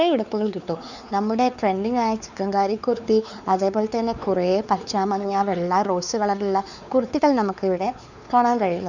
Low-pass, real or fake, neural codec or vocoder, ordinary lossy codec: 7.2 kHz; fake; codec, 16 kHz, 4 kbps, X-Codec, WavLM features, trained on Multilingual LibriSpeech; none